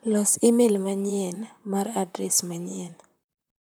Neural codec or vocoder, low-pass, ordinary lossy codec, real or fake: vocoder, 44.1 kHz, 128 mel bands, Pupu-Vocoder; none; none; fake